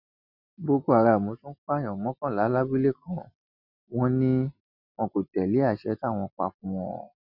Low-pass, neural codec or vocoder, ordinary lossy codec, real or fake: 5.4 kHz; none; none; real